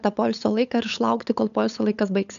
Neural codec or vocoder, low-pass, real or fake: codec, 16 kHz, 8 kbps, FunCodec, trained on LibriTTS, 25 frames a second; 7.2 kHz; fake